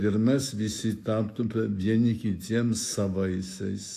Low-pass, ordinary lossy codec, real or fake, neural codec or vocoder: 14.4 kHz; AAC, 64 kbps; fake; autoencoder, 48 kHz, 128 numbers a frame, DAC-VAE, trained on Japanese speech